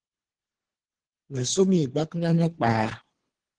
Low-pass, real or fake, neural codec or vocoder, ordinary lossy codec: 9.9 kHz; fake; codec, 24 kHz, 3 kbps, HILCodec; Opus, 16 kbps